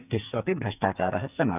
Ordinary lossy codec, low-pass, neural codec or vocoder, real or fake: none; 3.6 kHz; codec, 44.1 kHz, 2.6 kbps, SNAC; fake